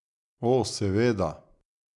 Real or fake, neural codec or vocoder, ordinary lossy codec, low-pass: real; none; none; 10.8 kHz